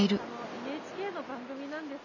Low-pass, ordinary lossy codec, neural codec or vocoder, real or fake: 7.2 kHz; none; none; real